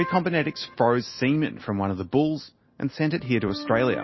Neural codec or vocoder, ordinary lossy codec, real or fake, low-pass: none; MP3, 24 kbps; real; 7.2 kHz